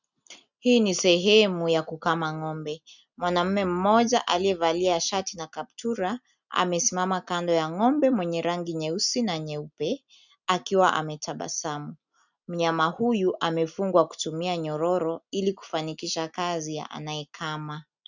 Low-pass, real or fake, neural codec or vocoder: 7.2 kHz; real; none